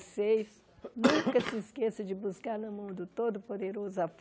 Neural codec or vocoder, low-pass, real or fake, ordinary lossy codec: none; none; real; none